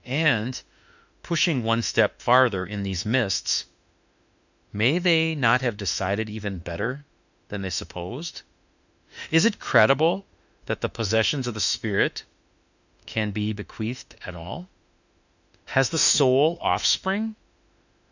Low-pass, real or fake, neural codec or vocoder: 7.2 kHz; fake; autoencoder, 48 kHz, 32 numbers a frame, DAC-VAE, trained on Japanese speech